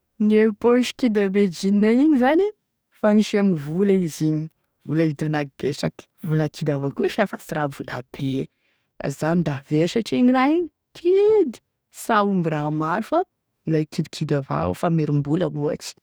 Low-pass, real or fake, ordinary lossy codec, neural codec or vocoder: none; fake; none; codec, 44.1 kHz, 2.6 kbps, DAC